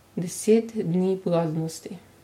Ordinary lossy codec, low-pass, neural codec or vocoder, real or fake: MP3, 64 kbps; 19.8 kHz; none; real